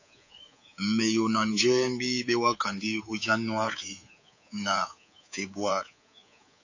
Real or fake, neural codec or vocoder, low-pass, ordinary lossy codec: fake; codec, 24 kHz, 3.1 kbps, DualCodec; 7.2 kHz; AAC, 48 kbps